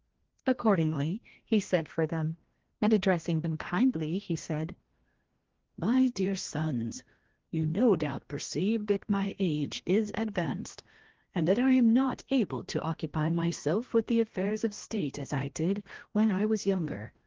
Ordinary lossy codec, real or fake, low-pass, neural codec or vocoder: Opus, 16 kbps; fake; 7.2 kHz; codec, 16 kHz, 1 kbps, FreqCodec, larger model